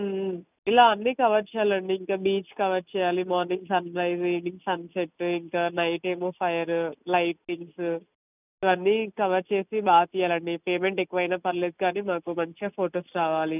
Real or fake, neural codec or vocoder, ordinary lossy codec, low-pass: real; none; none; 3.6 kHz